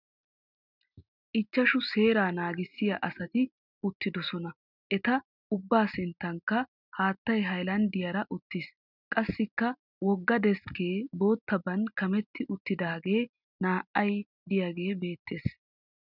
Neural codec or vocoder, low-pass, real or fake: none; 5.4 kHz; real